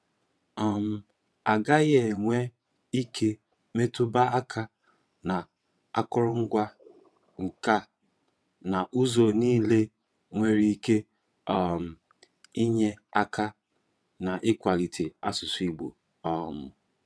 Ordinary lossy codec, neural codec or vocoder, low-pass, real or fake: none; vocoder, 22.05 kHz, 80 mel bands, WaveNeXt; none; fake